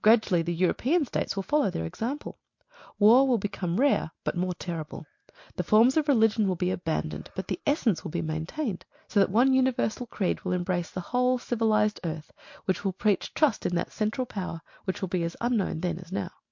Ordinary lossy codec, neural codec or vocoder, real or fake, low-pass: MP3, 48 kbps; none; real; 7.2 kHz